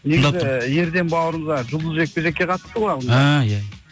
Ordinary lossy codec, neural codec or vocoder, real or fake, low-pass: none; none; real; none